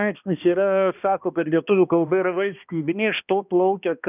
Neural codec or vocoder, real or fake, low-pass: codec, 16 kHz, 1 kbps, X-Codec, HuBERT features, trained on balanced general audio; fake; 3.6 kHz